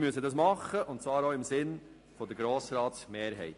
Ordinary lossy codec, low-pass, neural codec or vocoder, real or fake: AAC, 48 kbps; 10.8 kHz; none; real